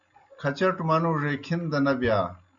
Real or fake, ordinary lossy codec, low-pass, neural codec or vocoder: real; MP3, 96 kbps; 7.2 kHz; none